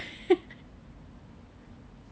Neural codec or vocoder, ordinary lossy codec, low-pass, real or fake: none; none; none; real